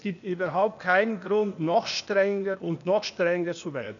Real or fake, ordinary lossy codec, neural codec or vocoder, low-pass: fake; none; codec, 16 kHz, 0.8 kbps, ZipCodec; 7.2 kHz